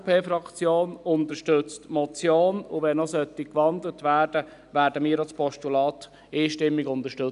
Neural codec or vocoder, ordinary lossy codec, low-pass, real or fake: none; none; 10.8 kHz; real